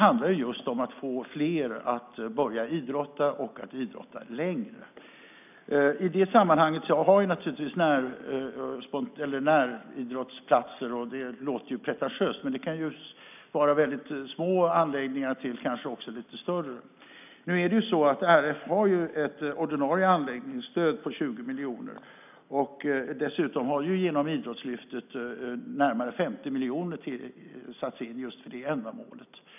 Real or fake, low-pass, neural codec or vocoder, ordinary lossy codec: real; 3.6 kHz; none; none